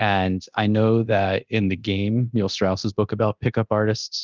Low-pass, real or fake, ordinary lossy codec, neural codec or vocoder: 7.2 kHz; fake; Opus, 32 kbps; codec, 24 kHz, 0.9 kbps, DualCodec